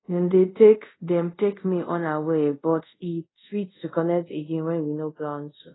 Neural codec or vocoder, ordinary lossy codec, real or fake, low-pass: codec, 24 kHz, 0.5 kbps, DualCodec; AAC, 16 kbps; fake; 7.2 kHz